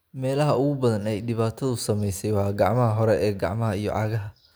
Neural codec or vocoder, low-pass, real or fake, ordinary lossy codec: none; none; real; none